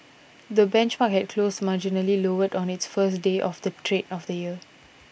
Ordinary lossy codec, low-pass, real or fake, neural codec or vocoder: none; none; real; none